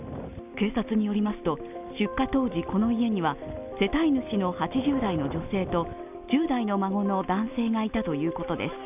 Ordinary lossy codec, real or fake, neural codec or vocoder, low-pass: none; real; none; 3.6 kHz